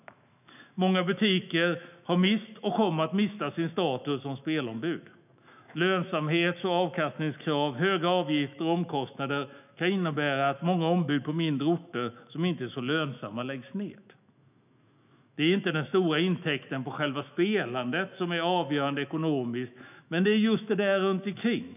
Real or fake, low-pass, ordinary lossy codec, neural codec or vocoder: fake; 3.6 kHz; none; autoencoder, 48 kHz, 128 numbers a frame, DAC-VAE, trained on Japanese speech